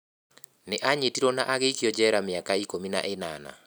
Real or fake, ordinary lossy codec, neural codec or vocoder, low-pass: real; none; none; none